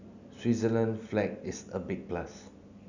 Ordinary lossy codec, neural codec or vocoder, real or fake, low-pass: none; none; real; 7.2 kHz